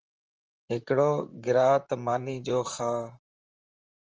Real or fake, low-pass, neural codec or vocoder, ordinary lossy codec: fake; 7.2 kHz; vocoder, 44.1 kHz, 128 mel bands, Pupu-Vocoder; Opus, 24 kbps